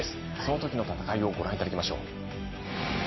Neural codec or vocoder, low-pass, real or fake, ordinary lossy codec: none; 7.2 kHz; real; MP3, 24 kbps